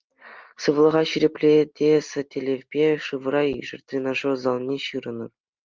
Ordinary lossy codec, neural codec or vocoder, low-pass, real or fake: Opus, 24 kbps; none; 7.2 kHz; real